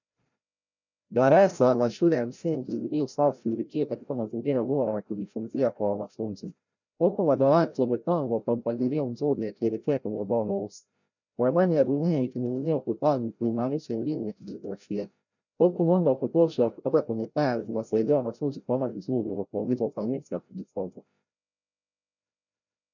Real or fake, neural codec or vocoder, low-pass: fake; codec, 16 kHz, 0.5 kbps, FreqCodec, larger model; 7.2 kHz